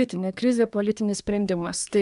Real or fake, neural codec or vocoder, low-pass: fake; codec, 24 kHz, 1 kbps, SNAC; 10.8 kHz